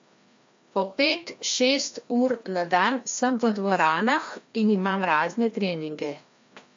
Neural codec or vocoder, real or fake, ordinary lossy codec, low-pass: codec, 16 kHz, 1 kbps, FreqCodec, larger model; fake; MP3, 48 kbps; 7.2 kHz